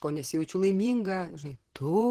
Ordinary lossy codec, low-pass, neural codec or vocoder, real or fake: Opus, 16 kbps; 14.4 kHz; vocoder, 44.1 kHz, 128 mel bands, Pupu-Vocoder; fake